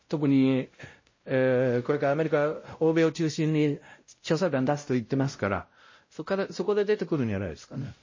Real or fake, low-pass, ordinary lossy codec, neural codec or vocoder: fake; 7.2 kHz; MP3, 32 kbps; codec, 16 kHz, 0.5 kbps, X-Codec, WavLM features, trained on Multilingual LibriSpeech